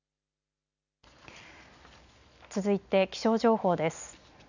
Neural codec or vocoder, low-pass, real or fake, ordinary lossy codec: none; 7.2 kHz; real; none